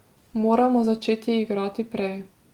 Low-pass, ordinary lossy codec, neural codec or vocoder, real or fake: 19.8 kHz; Opus, 24 kbps; none; real